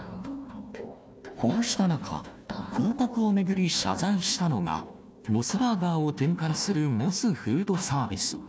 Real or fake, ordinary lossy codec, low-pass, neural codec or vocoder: fake; none; none; codec, 16 kHz, 1 kbps, FunCodec, trained on Chinese and English, 50 frames a second